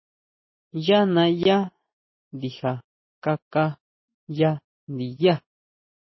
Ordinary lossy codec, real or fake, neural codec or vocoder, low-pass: MP3, 24 kbps; real; none; 7.2 kHz